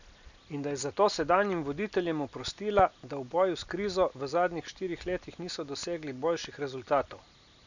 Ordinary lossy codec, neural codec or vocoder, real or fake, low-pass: none; none; real; 7.2 kHz